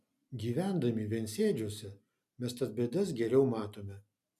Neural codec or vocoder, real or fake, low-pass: none; real; 14.4 kHz